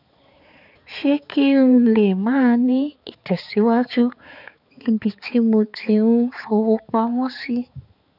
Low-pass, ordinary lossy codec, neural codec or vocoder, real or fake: 5.4 kHz; none; codec, 16 kHz, 4 kbps, X-Codec, HuBERT features, trained on balanced general audio; fake